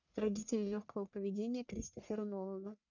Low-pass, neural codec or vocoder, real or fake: 7.2 kHz; codec, 44.1 kHz, 1.7 kbps, Pupu-Codec; fake